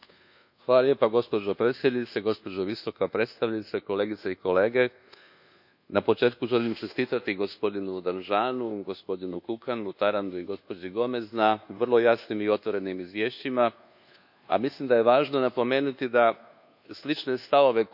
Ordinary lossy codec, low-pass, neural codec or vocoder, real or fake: none; 5.4 kHz; codec, 24 kHz, 1.2 kbps, DualCodec; fake